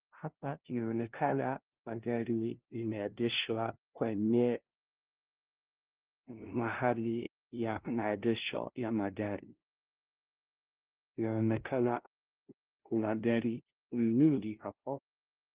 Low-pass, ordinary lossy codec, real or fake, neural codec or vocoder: 3.6 kHz; Opus, 16 kbps; fake; codec, 16 kHz, 0.5 kbps, FunCodec, trained on LibriTTS, 25 frames a second